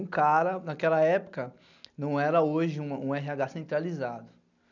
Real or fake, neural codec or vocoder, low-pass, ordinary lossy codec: real; none; 7.2 kHz; none